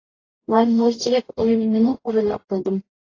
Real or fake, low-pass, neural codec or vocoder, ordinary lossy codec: fake; 7.2 kHz; codec, 44.1 kHz, 0.9 kbps, DAC; AAC, 32 kbps